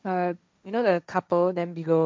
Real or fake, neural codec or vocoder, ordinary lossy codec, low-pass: fake; codec, 16 kHz, 1.1 kbps, Voila-Tokenizer; none; none